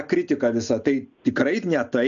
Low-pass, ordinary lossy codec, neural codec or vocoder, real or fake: 7.2 kHz; MP3, 96 kbps; none; real